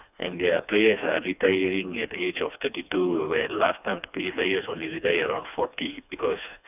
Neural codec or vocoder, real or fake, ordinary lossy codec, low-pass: codec, 16 kHz, 2 kbps, FreqCodec, smaller model; fake; none; 3.6 kHz